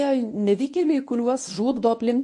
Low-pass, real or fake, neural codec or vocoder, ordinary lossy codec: 10.8 kHz; fake; codec, 24 kHz, 0.9 kbps, WavTokenizer, medium speech release version 1; MP3, 48 kbps